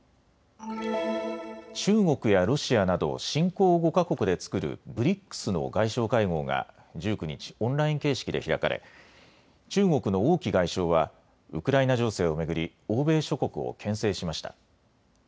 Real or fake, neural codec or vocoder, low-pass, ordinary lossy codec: real; none; none; none